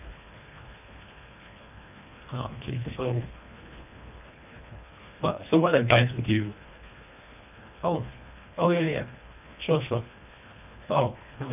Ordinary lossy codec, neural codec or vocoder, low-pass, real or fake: none; codec, 24 kHz, 1.5 kbps, HILCodec; 3.6 kHz; fake